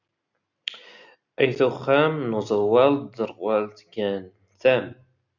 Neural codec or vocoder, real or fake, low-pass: none; real; 7.2 kHz